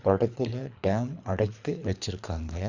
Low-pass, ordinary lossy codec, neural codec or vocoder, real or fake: 7.2 kHz; Opus, 64 kbps; codec, 24 kHz, 3 kbps, HILCodec; fake